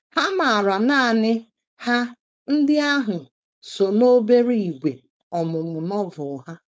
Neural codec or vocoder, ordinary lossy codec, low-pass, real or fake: codec, 16 kHz, 4.8 kbps, FACodec; none; none; fake